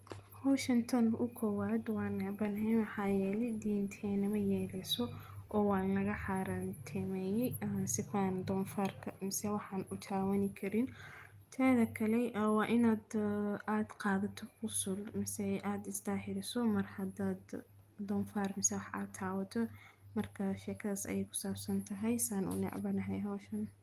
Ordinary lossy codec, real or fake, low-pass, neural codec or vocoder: Opus, 32 kbps; real; 14.4 kHz; none